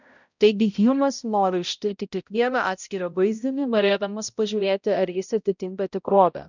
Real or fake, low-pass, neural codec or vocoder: fake; 7.2 kHz; codec, 16 kHz, 0.5 kbps, X-Codec, HuBERT features, trained on balanced general audio